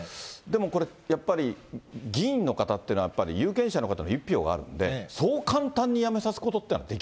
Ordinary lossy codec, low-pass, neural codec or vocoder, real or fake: none; none; none; real